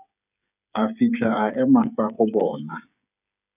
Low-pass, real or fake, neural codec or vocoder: 3.6 kHz; fake; codec, 16 kHz, 8 kbps, FreqCodec, smaller model